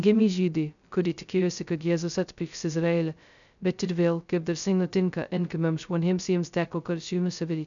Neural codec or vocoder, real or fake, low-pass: codec, 16 kHz, 0.2 kbps, FocalCodec; fake; 7.2 kHz